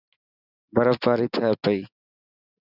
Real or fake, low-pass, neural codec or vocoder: real; 5.4 kHz; none